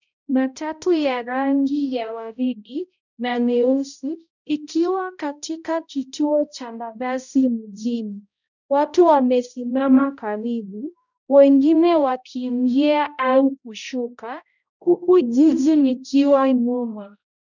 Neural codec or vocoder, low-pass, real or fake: codec, 16 kHz, 0.5 kbps, X-Codec, HuBERT features, trained on balanced general audio; 7.2 kHz; fake